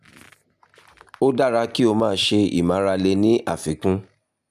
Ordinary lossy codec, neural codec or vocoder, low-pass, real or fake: none; none; 14.4 kHz; real